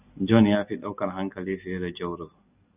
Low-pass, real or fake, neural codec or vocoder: 3.6 kHz; real; none